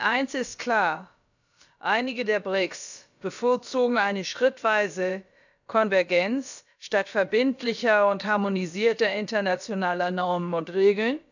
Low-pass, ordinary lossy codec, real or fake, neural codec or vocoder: 7.2 kHz; none; fake; codec, 16 kHz, about 1 kbps, DyCAST, with the encoder's durations